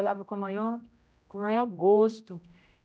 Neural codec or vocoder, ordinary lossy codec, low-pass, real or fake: codec, 16 kHz, 0.5 kbps, X-Codec, HuBERT features, trained on general audio; none; none; fake